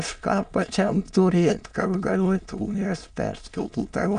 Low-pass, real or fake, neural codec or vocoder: 9.9 kHz; fake; autoencoder, 22.05 kHz, a latent of 192 numbers a frame, VITS, trained on many speakers